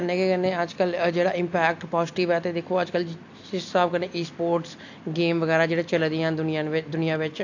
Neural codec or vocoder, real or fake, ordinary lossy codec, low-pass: none; real; AAC, 48 kbps; 7.2 kHz